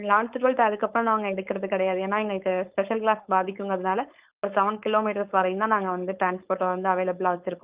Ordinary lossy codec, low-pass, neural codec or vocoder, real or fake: Opus, 32 kbps; 3.6 kHz; codec, 16 kHz, 4.8 kbps, FACodec; fake